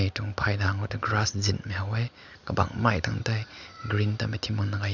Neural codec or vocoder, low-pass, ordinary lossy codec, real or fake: none; 7.2 kHz; none; real